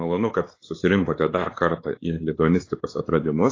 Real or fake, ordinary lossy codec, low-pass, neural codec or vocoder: fake; AAC, 32 kbps; 7.2 kHz; codec, 16 kHz, 4 kbps, X-Codec, WavLM features, trained on Multilingual LibriSpeech